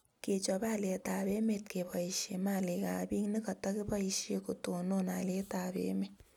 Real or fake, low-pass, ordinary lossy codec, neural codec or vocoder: real; 19.8 kHz; none; none